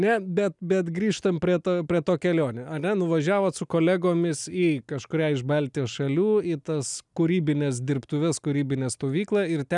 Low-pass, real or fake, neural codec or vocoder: 10.8 kHz; real; none